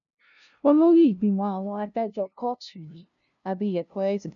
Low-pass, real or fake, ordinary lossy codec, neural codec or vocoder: 7.2 kHz; fake; none; codec, 16 kHz, 0.5 kbps, FunCodec, trained on LibriTTS, 25 frames a second